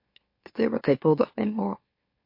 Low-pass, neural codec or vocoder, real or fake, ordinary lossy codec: 5.4 kHz; autoencoder, 44.1 kHz, a latent of 192 numbers a frame, MeloTTS; fake; MP3, 32 kbps